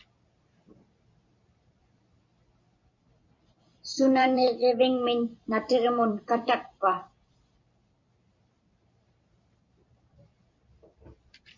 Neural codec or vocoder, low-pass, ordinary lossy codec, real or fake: vocoder, 44.1 kHz, 128 mel bands every 256 samples, BigVGAN v2; 7.2 kHz; MP3, 48 kbps; fake